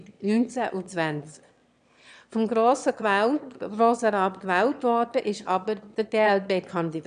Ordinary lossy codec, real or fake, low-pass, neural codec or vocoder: none; fake; 9.9 kHz; autoencoder, 22.05 kHz, a latent of 192 numbers a frame, VITS, trained on one speaker